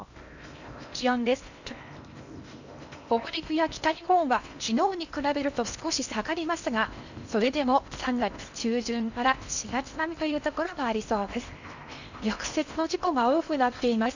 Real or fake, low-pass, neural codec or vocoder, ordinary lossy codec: fake; 7.2 kHz; codec, 16 kHz in and 24 kHz out, 0.8 kbps, FocalCodec, streaming, 65536 codes; none